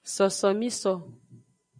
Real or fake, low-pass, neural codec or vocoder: real; 9.9 kHz; none